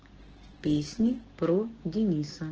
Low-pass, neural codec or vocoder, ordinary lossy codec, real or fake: 7.2 kHz; codec, 16 kHz, 6 kbps, DAC; Opus, 16 kbps; fake